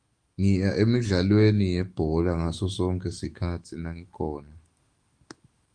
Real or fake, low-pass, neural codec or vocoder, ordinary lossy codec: fake; 9.9 kHz; autoencoder, 48 kHz, 128 numbers a frame, DAC-VAE, trained on Japanese speech; Opus, 24 kbps